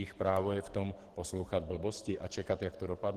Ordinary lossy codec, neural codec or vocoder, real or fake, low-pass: Opus, 16 kbps; codec, 44.1 kHz, 7.8 kbps, DAC; fake; 14.4 kHz